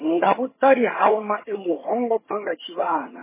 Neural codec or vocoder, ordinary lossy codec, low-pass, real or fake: vocoder, 22.05 kHz, 80 mel bands, HiFi-GAN; MP3, 16 kbps; 3.6 kHz; fake